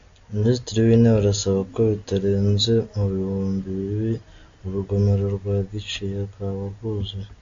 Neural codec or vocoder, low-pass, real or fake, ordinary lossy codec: none; 7.2 kHz; real; AAC, 48 kbps